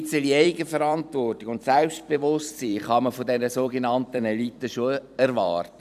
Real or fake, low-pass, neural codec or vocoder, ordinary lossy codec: fake; 14.4 kHz; vocoder, 44.1 kHz, 128 mel bands every 256 samples, BigVGAN v2; none